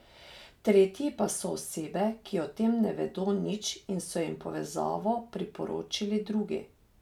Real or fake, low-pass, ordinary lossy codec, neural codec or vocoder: real; 19.8 kHz; none; none